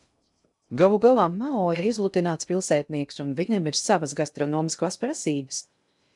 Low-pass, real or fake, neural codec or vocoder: 10.8 kHz; fake; codec, 16 kHz in and 24 kHz out, 0.6 kbps, FocalCodec, streaming, 2048 codes